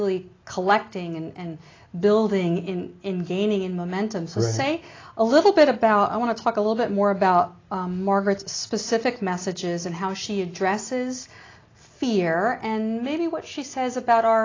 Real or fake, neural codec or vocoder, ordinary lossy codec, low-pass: real; none; AAC, 32 kbps; 7.2 kHz